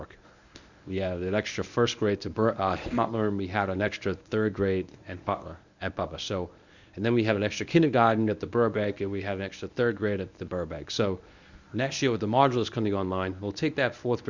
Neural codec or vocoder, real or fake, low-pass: codec, 24 kHz, 0.9 kbps, WavTokenizer, medium speech release version 1; fake; 7.2 kHz